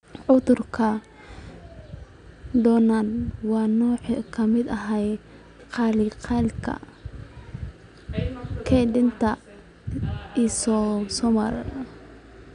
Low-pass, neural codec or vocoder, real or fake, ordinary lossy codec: 9.9 kHz; none; real; none